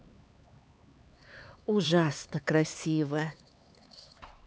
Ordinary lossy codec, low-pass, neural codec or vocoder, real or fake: none; none; codec, 16 kHz, 4 kbps, X-Codec, HuBERT features, trained on LibriSpeech; fake